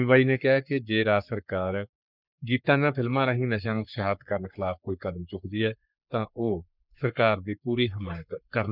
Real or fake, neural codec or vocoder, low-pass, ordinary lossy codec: fake; codec, 44.1 kHz, 3.4 kbps, Pupu-Codec; 5.4 kHz; none